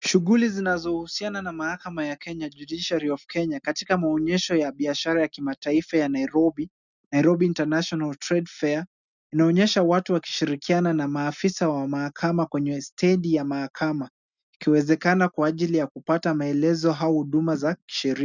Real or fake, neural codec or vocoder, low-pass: real; none; 7.2 kHz